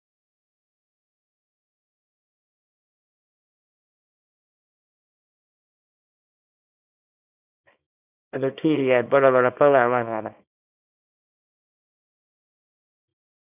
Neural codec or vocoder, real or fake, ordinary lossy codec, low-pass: codec, 24 kHz, 0.9 kbps, WavTokenizer, small release; fake; none; 3.6 kHz